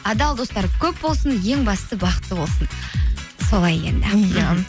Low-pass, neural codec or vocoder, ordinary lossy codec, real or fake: none; none; none; real